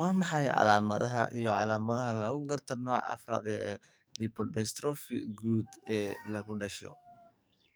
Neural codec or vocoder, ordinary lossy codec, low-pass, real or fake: codec, 44.1 kHz, 2.6 kbps, SNAC; none; none; fake